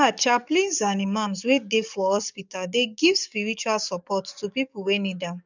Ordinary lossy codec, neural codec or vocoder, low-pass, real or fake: none; codec, 44.1 kHz, 7.8 kbps, DAC; 7.2 kHz; fake